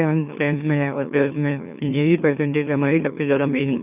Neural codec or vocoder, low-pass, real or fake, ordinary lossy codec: autoencoder, 44.1 kHz, a latent of 192 numbers a frame, MeloTTS; 3.6 kHz; fake; none